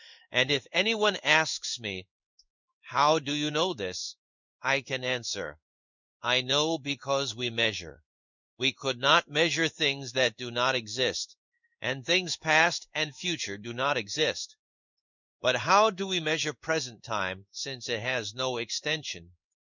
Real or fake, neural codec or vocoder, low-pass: fake; codec, 16 kHz in and 24 kHz out, 1 kbps, XY-Tokenizer; 7.2 kHz